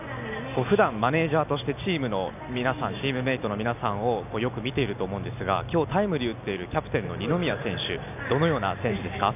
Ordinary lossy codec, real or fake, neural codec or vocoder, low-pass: none; real; none; 3.6 kHz